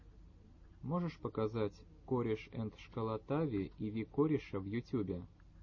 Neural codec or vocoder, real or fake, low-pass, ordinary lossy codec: none; real; 7.2 kHz; MP3, 32 kbps